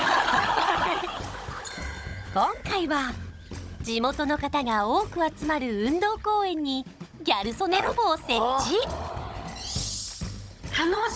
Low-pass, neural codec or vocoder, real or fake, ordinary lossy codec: none; codec, 16 kHz, 16 kbps, FunCodec, trained on Chinese and English, 50 frames a second; fake; none